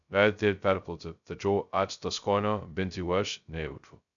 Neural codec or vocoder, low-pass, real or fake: codec, 16 kHz, 0.2 kbps, FocalCodec; 7.2 kHz; fake